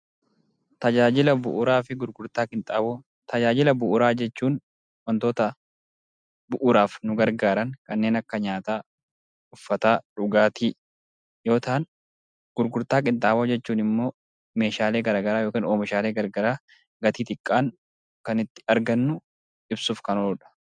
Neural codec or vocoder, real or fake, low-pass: none; real; 9.9 kHz